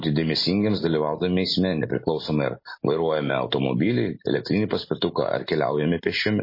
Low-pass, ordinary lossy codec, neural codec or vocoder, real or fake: 5.4 kHz; MP3, 24 kbps; none; real